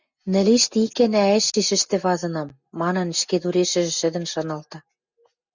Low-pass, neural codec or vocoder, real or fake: 7.2 kHz; none; real